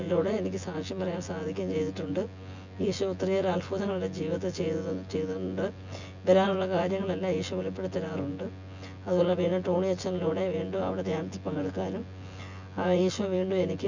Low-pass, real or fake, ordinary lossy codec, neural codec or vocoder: 7.2 kHz; fake; MP3, 64 kbps; vocoder, 24 kHz, 100 mel bands, Vocos